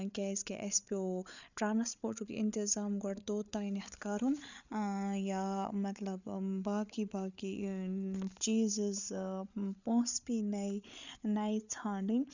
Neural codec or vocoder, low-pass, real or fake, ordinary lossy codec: codec, 16 kHz, 8 kbps, FreqCodec, larger model; 7.2 kHz; fake; none